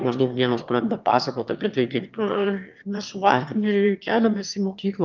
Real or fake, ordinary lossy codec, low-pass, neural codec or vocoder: fake; Opus, 24 kbps; 7.2 kHz; autoencoder, 22.05 kHz, a latent of 192 numbers a frame, VITS, trained on one speaker